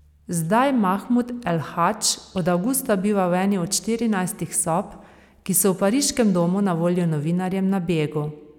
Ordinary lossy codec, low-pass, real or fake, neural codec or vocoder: none; 19.8 kHz; real; none